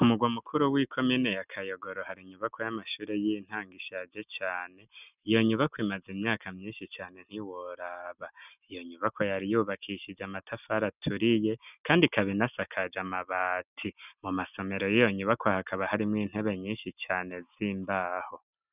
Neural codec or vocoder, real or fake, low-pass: none; real; 3.6 kHz